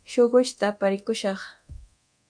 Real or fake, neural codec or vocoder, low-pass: fake; codec, 24 kHz, 0.9 kbps, DualCodec; 9.9 kHz